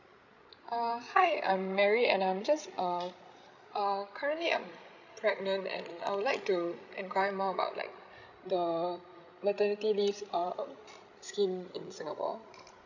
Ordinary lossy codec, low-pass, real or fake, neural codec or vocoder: none; 7.2 kHz; fake; codec, 16 kHz, 16 kbps, FreqCodec, larger model